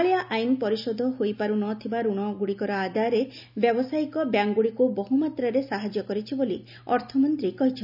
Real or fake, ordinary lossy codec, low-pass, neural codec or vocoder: real; none; 5.4 kHz; none